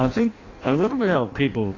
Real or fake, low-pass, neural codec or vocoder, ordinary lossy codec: fake; 7.2 kHz; codec, 16 kHz in and 24 kHz out, 0.6 kbps, FireRedTTS-2 codec; AAC, 32 kbps